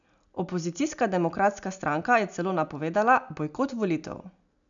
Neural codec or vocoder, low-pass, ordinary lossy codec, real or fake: none; 7.2 kHz; none; real